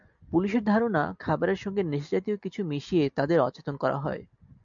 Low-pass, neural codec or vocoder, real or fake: 7.2 kHz; none; real